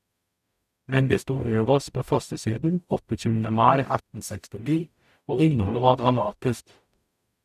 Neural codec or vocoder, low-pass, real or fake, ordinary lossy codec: codec, 44.1 kHz, 0.9 kbps, DAC; 14.4 kHz; fake; none